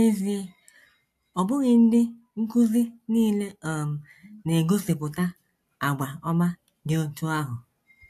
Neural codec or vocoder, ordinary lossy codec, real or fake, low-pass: none; AAC, 64 kbps; real; 14.4 kHz